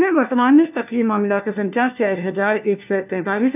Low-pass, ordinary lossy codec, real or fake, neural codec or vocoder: 3.6 kHz; none; fake; codec, 16 kHz, 1 kbps, FunCodec, trained on LibriTTS, 50 frames a second